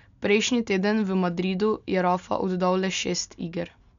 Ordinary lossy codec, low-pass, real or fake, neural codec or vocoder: none; 7.2 kHz; real; none